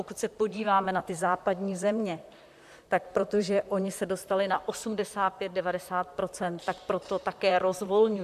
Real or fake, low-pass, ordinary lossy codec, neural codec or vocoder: fake; 14.4 kHz; AAC, 96 kbps; vocoder, 44.1 kHz, 128 mel bands, Pupu-Vocoder